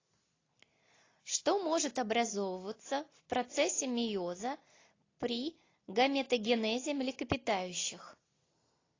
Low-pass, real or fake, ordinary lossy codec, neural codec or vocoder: 7.2 kHz; real; AAC, 32 kbps; none